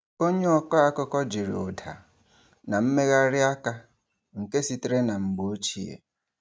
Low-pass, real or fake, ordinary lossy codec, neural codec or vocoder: none; real; none; none